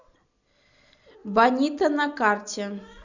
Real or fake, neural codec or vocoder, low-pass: real; none; 7.2 kHz